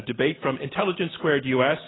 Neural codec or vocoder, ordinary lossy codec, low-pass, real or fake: none; AAC, 16 kbps; 7.2 kHz; real